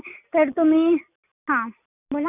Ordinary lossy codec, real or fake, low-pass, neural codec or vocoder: none; real; 3.6 kHz; none